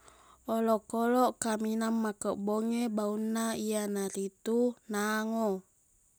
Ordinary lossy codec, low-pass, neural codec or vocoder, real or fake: none; none; none; real